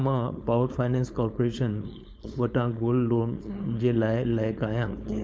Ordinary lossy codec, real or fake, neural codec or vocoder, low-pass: none; fake; codec, 16 kHz, 4.8 kbps, FACodec; none